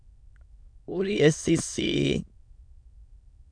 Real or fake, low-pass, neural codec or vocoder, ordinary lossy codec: fake; 9.9 kHz; autoencoder, 22.05 kHz, a latent of 192 numbers a frame, VITS, trained on many speakers; MP3, 96 kbps